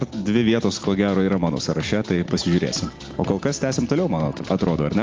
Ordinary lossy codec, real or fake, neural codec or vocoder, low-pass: Opus, 32 kbps; real; none; 7.2 kHz